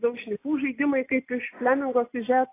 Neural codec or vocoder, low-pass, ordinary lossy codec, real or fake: none; 3.6 kHz; AAC, 24 kbps; real